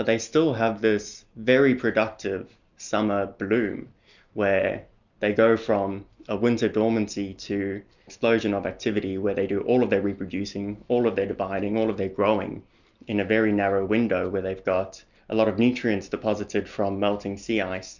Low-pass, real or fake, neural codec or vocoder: 7.2 kHz; real; none